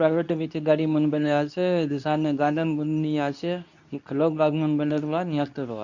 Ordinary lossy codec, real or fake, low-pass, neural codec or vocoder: none; fake; 7.2 kHz; codec, 24 kHz, 0.9 kbps, WavTokenizer, medium speech release version 2